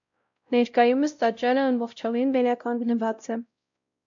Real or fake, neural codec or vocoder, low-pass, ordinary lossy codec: fake; codec, 16 kHz, 0.5 kbps, X-Codec, WavLM features, trained on Multilingual LibriSpeech; 7.2 kHz; MP3, 64 kbps